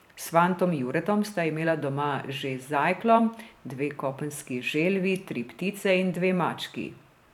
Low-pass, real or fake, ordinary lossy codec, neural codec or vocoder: 19.8 kHz; fake; none; vocoder, 44.1 kHz, 128 mel bands every 512 samples, BigVGAN v2